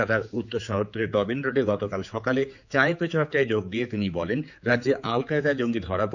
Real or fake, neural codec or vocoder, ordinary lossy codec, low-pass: fake; codec, 16 kHz, 4 kbps, X-Codec, HuBERT features, trained on general audio; none; 7.2 kHz